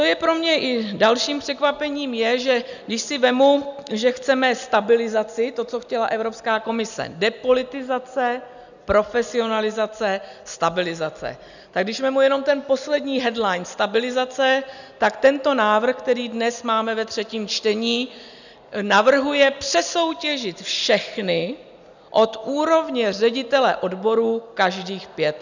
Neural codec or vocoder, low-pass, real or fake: none; 7.2 kHz; real